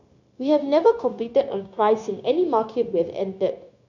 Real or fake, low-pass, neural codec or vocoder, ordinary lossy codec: fake; 7.2 kHz; codec, 16 kHz, 0.9 kbps, LongCat-Audio-Codec; none